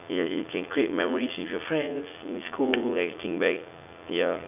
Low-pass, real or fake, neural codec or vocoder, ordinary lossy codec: 3.6 kHz; fake; vocoder, 44.1 kHz, 80 mel bands, Vocos; none